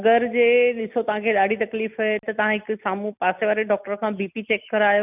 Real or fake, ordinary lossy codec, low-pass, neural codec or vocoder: real; none; 3.6 kHz; none